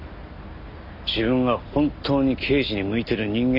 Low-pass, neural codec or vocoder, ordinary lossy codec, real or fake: 5.4 kHz; none; none; real